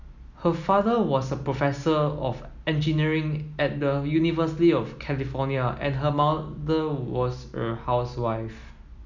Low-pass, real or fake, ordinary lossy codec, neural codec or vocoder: 7.2 kHz; real; none; none